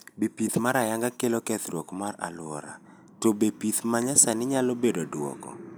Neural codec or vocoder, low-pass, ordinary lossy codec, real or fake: none; none; none; real